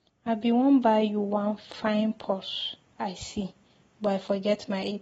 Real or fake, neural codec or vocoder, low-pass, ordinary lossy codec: real; none; 19.8 kHz; AAC, 24 kbps